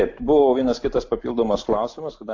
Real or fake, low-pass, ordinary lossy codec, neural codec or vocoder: real; 7.2 kHz; AAC, 48 kbps; none